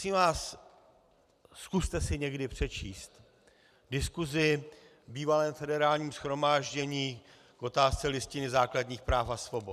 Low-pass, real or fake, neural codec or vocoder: 14.4 kHz; fake; vocoder, 44.1 kHz, 128 mel bands every 256 samples, BigVGAN v2